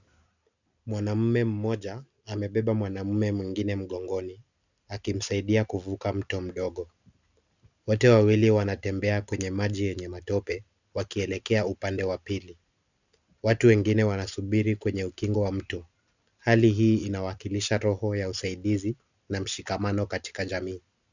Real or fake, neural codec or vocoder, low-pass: real; none; 7.2 kHz